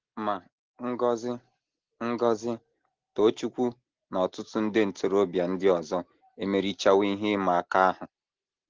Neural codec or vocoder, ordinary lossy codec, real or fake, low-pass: none; Opus, 16 kbps; real; 7.2 kHz